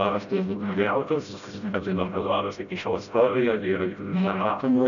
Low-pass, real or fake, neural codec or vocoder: 7.2 kHz; fake; codec, 16 kHz, 0.5 kbps, FreqCodec, smaller model